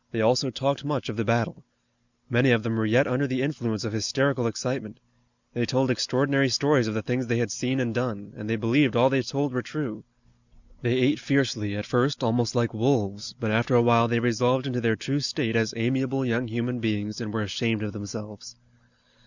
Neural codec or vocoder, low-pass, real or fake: none; 7.2 kHz; real